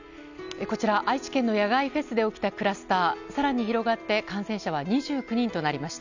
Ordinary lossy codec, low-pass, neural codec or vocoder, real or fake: none; 7.2 kHz; none; real